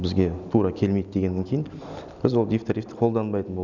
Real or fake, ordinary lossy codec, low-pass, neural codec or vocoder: real; none; 7.2 kHz; none